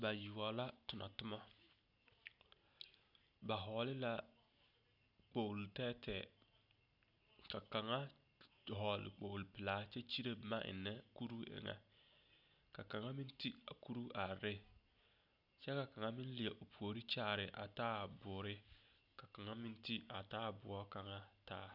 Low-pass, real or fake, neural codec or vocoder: 5.4 kHz; real; none